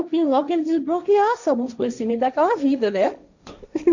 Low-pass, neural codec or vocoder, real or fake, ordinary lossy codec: 7.2 kHz; codec, 16 kHz, 1.1 kbps, Voila-Tokenizer; fake; none